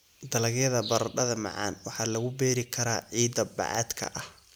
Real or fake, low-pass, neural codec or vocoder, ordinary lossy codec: real; none; none; none